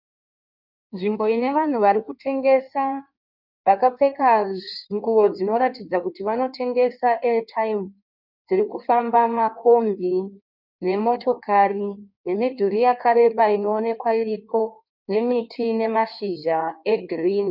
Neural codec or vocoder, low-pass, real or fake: codec, 16 kHz in and 24 kHz out, 1.1 kbps, FireRedTTS-2 codec; 5.4 kHz; fake